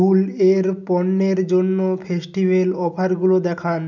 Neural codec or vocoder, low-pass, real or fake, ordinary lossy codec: none; 7.2 kHz; real; none